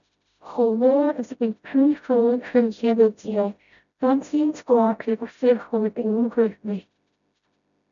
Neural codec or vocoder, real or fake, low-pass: codec, 16 kHz, 0.5 kbps, FreqCodec, smaller model; fake; 7.2 kHz